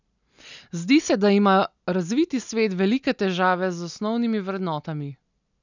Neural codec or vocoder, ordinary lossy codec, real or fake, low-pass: none; none; real; 7.2 kHz